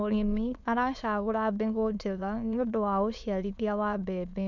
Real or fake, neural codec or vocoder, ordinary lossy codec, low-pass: fake; autoencoder, 22.05 kHz, a latent of 192 numbers a frame, VITS, trained on many speakers; none; 7.2 kHz